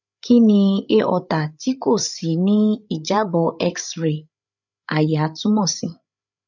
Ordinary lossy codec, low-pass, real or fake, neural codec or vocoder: none; 7.2 kHz; fake; codec, 16 kHz, 8 kbps, FreqCodec, larger model